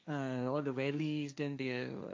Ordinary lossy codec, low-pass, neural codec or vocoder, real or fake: none; none; codec, 16 kHz, 1.1 kbps, Voila-Tokenizer; fake